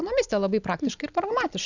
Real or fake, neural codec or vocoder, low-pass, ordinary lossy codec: real; none; 7.2 kHz; Opus, 64 kbps